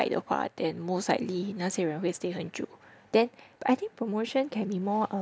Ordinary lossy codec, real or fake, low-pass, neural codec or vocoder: none; real; none; none